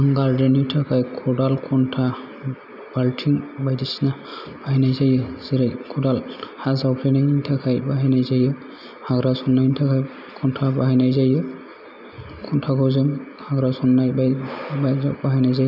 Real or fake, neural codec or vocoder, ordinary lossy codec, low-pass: real; none; none; 5.4 kHz